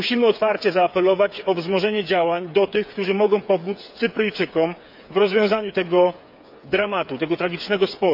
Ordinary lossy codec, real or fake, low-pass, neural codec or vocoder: none; fake; 5.4 kHz; codec, 16 kHz, 8 kbps, FreqCodec, smaller model